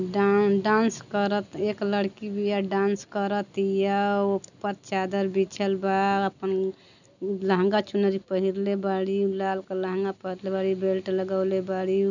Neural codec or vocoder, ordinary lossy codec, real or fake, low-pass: none; none; real; 7.2 kHz